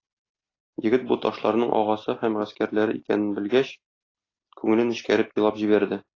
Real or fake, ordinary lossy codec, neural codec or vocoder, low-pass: real; AAC, 32 kbps; none; 7.2 kHz